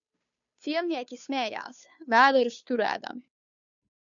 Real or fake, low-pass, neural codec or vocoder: fake; 7.2 kHz; codec, 16 kHz, 2 kbps, FunCodec, trained on Chinese and English, 25 frames a second